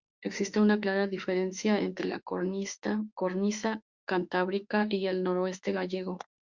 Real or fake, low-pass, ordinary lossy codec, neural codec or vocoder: fake; 7.2 kHz; Opus, 64 kbps; autoencoder, 48 kHz, 32 numbers a frame, DAC-VAE, trained on Japanese speech